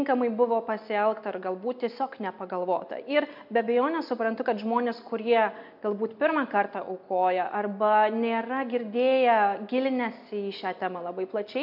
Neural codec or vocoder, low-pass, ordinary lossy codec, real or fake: none; 5.4 kHz; MP3, 48 kbps; real